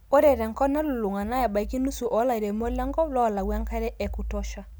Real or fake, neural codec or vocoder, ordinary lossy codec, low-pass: real; none; none; none